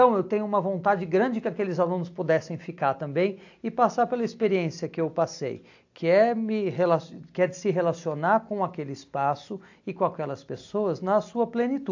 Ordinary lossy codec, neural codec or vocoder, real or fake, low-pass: AAC, 48 kbps; none; real; 7.2 kHz